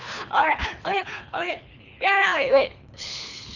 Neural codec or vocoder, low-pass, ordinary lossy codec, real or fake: codec, 24 kHz, 3 kbps, HILCodec; 7.2 kHz; none; fake